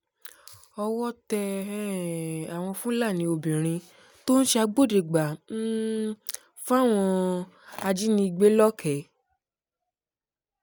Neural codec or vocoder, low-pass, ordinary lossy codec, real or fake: none; none; none; real